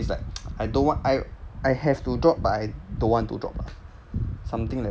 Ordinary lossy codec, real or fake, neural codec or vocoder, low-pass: none; real; none; none